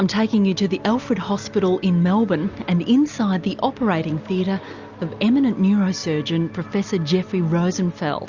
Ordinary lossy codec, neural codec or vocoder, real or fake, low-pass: Opus, 64 kbps; none; real; 7.2 kHz